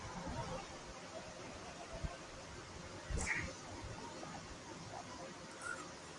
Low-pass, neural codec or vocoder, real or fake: 10.8 kHz; vocoder, 44.1 kHz, 128 mel bands every 256 samples, BigVGAN v2; fake